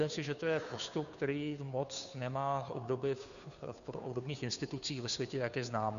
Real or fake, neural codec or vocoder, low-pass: fake; codec, 16 kHz, 2 kbps, FunCodec, trained on Chinese and English, 25 frames a second; 7.2 kHz